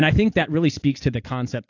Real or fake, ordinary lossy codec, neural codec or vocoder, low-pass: real; AAC, 48 kbps; none; 7.2 kHz